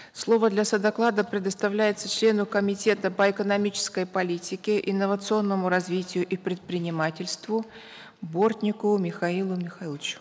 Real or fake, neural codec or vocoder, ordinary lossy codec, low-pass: real; none; none; none